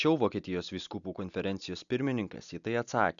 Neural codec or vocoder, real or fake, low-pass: none; real; 7.2 kHz